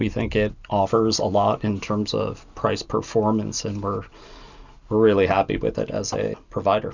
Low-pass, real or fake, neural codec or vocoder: 7.2 kHz; real; none